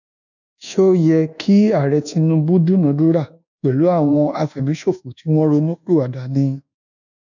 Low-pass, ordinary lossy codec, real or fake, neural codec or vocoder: 7.2 kHz; none; fake; codec, 24 kHz, 1.2 kbps, DualCodec